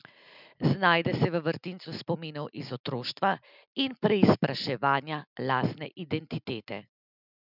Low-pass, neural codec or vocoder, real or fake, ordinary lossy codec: 5.4 kHz; none; real; none